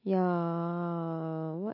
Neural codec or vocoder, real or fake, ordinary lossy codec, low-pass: none; real; MP3, 24 kbps; 5.4 kHz